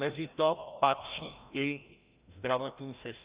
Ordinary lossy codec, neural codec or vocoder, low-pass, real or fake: Opus, 64 kbps; codec, 16 kHz, 1 kbps, FreqCodec, larger model; 3.6 kHz; fake